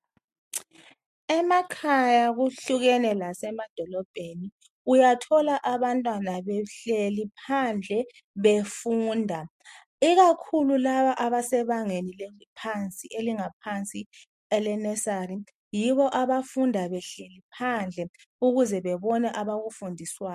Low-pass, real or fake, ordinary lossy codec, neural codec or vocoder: 14.4 kHz; real; MP3, 64 kbps; none